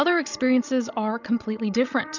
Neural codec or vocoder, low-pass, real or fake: codec, 16 kHz, 16 kbps, FreqCodec, larger model; 7.2 kHz; fake